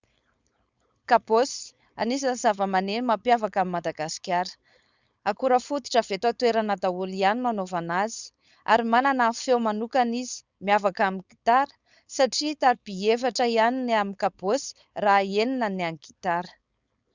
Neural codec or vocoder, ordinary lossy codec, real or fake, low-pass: codec, 16 kHz, 4.8 kbps, FACodec; Opus, 64 kbps; fake; 7.2 kHz